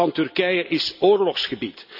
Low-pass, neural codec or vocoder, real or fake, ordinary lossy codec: 5.4 kHz; none; real; none